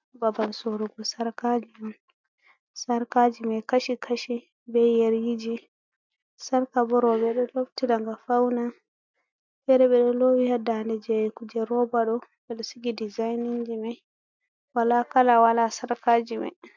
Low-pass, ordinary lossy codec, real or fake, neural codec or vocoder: 7.2 kHz; MP3, 64 kbps; real; none